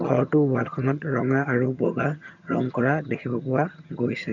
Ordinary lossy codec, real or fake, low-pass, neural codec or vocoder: none; fake; 7.2 kHz; vocoder, 22.05 kHz, 80 mel bands, HiFi-GAN